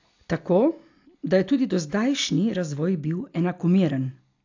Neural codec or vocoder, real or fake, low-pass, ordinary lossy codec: none; real; 7.2 kHz; AAC, 48 kbps